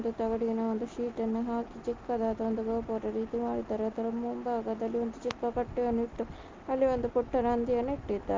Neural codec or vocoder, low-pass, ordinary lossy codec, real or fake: none; 7.2 kHz; Opus, 24 kbps; real